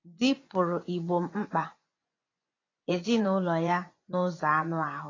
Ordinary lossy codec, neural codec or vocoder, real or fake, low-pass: AAC, 32 kbps; vocoder, 24 kHz, 100 mel bands, Vocos; fake; 7.2 kHz